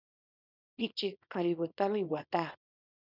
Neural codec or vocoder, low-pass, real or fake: codec, 24 kHz, 0.9 kbps, WavTokenizer, small release; 5.4 kHz; fake